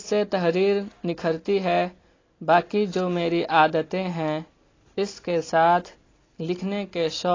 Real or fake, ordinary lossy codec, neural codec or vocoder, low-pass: real; AAC, 32 kbps; none; 7.2 kHz